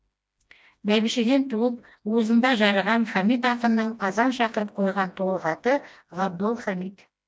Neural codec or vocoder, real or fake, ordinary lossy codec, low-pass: codec, 16 kHz, 1 kbps, FreqCodec, smaller model; fake; none; none